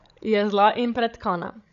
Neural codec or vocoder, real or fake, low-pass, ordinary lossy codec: codec, 16 kHz, 16 kbps, FreqCodec, larger model; fake; 7.2 kHz; none